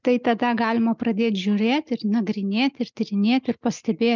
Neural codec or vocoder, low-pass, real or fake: none; 7.2 kHz; real